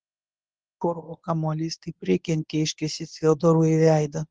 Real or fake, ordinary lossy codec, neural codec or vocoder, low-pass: fake; Opus, 32 kbps; codec, 24 kHz, 0.9 kbps, WavTokenizer, medium speech release version 1; 9.9 kHz